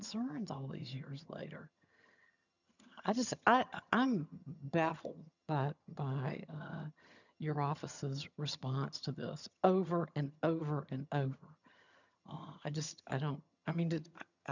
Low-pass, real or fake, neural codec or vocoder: 7.2 kHz; fake; vocoder, 22.05 kHz, 80 mel bands, HiFi-GAN